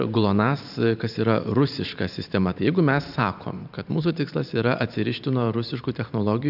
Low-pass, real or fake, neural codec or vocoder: 5.4 kHz; fake; vocoder, 44.1 kHz, 128 mel bands every 512 samples, BigVGAN v2